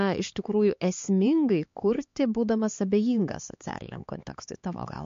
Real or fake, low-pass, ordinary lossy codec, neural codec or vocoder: fake; 7.2 kHz; MP3, 48 kbps; codec, 16 kHz, 4 kbps, X-Codec, HuBERT features, trained on LibriSpeech